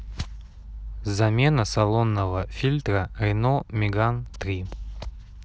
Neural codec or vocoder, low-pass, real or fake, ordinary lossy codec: none; none; real; none